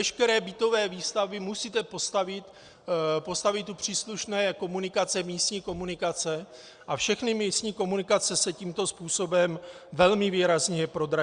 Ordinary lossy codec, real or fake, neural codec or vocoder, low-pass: Opus, 64 kbps; real; none; 9.9 kHz